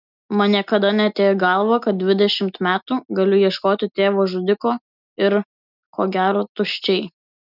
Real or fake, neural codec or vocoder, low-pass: real; none; 5.4 kHz